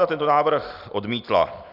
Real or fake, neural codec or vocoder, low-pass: real; none; 5.4 kHz